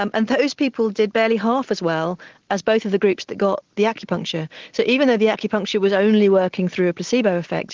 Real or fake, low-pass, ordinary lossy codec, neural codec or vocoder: real; 7.2 kHz; Opus, 16 kbps; none